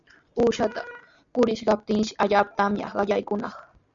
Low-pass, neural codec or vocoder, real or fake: 7.2 kHz; none; real